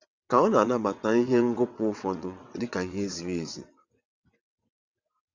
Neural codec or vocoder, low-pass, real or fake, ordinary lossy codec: vocoder, 22.05 kHz, 80 mel bands, WaveNeXt; 7.2 kHz; fake; Opus, 64 kbps